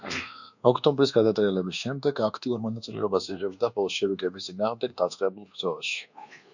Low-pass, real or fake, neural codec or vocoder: 7.2 kHz; fake; codec, 24 kHz, 1.2 kbps, DualCodec